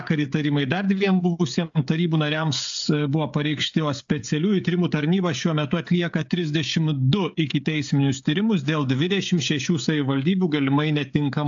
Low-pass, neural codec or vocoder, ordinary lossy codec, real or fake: 7.2 kHz; codec, 16 kHz, 16 kbps, FreqCodec, smaller model; AAC, 64 kbps; fake